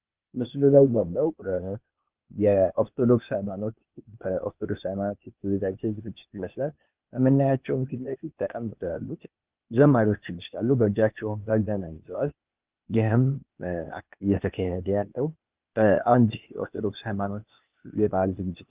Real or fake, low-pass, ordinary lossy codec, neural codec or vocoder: fake; 3.6 kHz; Opus, 32 kbps; codec, 16 kHz, 0.8 kbps, ZipCodec